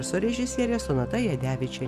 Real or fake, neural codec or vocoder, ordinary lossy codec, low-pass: real; none; Opus, 64 kbps; 14.4 kHz